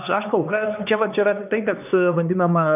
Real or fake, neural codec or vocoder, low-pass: fake; codec, 16 kHz, 2 kbps, X-Codec, HuBERT features, trained on LibriSpeech; 3.6 kHz